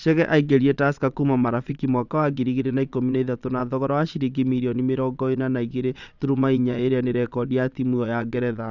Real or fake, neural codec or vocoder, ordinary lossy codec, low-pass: fake; vocoder, 22.05 kHz, 80 mel bands, Vocos; none; 7.2 kHz